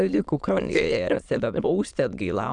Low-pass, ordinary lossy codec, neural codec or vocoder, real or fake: 9.9 kHz; MP3, 96 kbps; autoencoder, 22.05 kHz, a latent of 192 numbers a frame, VITS, trained on many speakers; fake